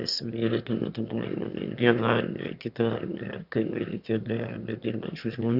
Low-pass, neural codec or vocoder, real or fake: 5.4 kHz; autoencoder, 22.05 kHz, a latent of 192 numbers a frame, VITS, trained on one speaker; fake